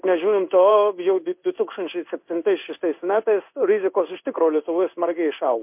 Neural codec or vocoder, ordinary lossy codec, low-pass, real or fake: codec, 16 kHz in and 24 kHz out, 1 kbps, XY-Tokenizer; MP3, 32 kbps; 3.6 kHz; fake